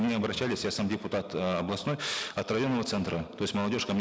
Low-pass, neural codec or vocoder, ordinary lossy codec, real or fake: none; none; none; real